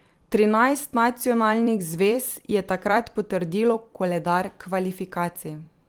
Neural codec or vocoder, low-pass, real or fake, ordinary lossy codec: vocoder, 44.1 kHz, 128 mel bands every 256 samples, BigVGAN v2; 19.8 kHz; fake; Opus, 24 kbps